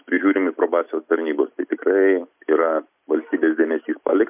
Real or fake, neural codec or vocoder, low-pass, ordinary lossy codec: real; none; 3.6 kHz; MP3, 32 kbps